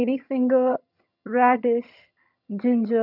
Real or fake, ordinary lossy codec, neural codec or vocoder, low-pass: fake; none; vocoder, 22.05 kHz, 80 mel bands, HiFi-GAN; 5.4 kHz